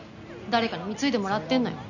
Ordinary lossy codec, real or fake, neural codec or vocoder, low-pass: none; real; none; 7.2 kHz